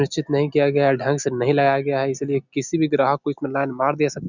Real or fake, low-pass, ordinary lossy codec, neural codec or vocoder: real; 7.2 kHz; none; none